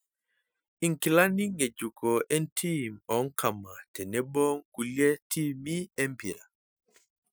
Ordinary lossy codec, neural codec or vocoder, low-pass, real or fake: none; none; none; real